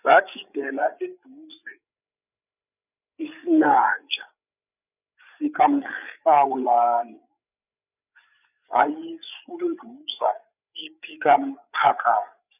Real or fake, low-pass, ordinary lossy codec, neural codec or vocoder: fake; 3.6 kHz; none; codec, 16 kHz, 8 kbps, FreqCodec, larger model